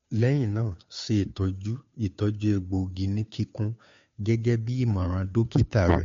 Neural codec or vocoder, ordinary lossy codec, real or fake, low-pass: codec, 16 kHz, 2 kbps, FunCodec, trained on Chinese and English, 25 frames a second; MP3, 48 kbps; fake; 7.2 kHz